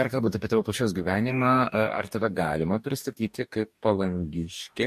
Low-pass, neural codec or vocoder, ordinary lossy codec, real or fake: 14.4 kHz; codec, 44.1 kHz, 2.6 kbps, DAC; MP3, 64 kbps; fake